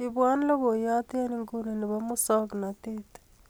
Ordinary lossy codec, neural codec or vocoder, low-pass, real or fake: none; none; none; real